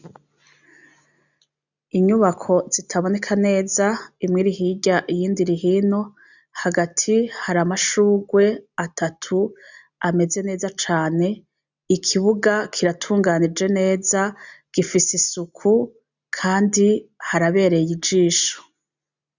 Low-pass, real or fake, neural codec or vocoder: 7.2 kHz; real; none